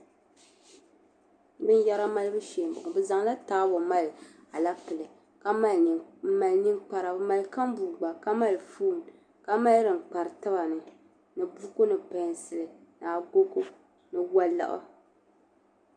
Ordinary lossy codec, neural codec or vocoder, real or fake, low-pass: MP3, 64 kbps; none; real; 9.9 kHz